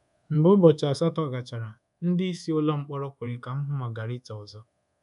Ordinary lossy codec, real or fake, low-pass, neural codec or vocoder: none; fake; 10.8 kHz; codec, 24 kHz, 1.2 kbps, DualCodec